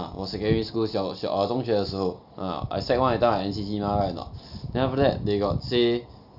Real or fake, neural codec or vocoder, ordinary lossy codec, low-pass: real; none; none; 5.4 kHz